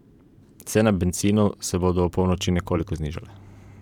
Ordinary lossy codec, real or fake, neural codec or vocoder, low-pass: none; fake; vocoder, 44.1 kHz, 128 mel bands every 512 samples, BigVGAN v2; 19.8 kHz